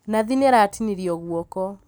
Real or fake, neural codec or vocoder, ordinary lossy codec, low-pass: real; none; none; none